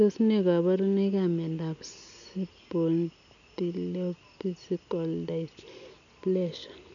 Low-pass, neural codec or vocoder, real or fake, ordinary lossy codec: 7.2 kHz; none; real; none